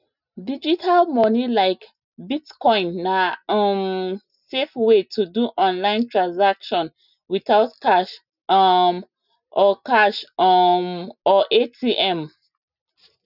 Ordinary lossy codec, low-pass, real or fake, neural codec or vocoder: none; 5.4 kHz; real; none